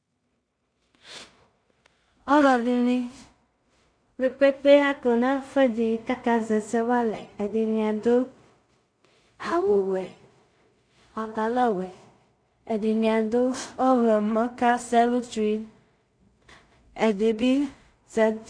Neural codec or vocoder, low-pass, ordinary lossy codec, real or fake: codec, 16 kHz in and 24 kHz out, 0.4 kbps, LongCat-Audio-Codec, two codebook decoder; 9.9 kHz; MP3, 64 kbps; fake